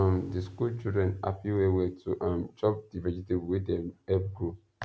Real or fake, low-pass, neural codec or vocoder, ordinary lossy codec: real; none; none; none